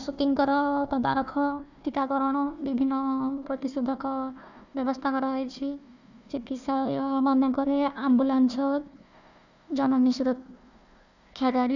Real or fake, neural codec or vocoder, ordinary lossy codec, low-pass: fake; codec, 16 kHz, 1 kbps, FunCodec, trained on Chinese and English, 50 frames a second; none; 7.2 kHz